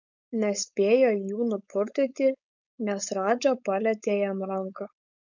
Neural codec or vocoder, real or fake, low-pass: codec, 16 kHz, 4.8 kbps, FACodec; fake; 7.2 kHz